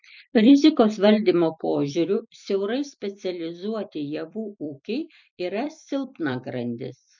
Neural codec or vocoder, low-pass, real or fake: vocoder, 44.1 kHz, 128 mel bands every 256 samples, BigVGAN v2; 7.2 kHz; fake